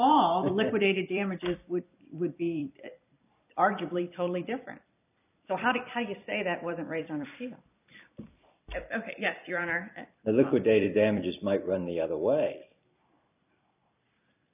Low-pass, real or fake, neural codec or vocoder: 3.6 kHz; fake; vocoder, 44.1 kHz, 128 mel bands every 256 samples, BigVGAN v2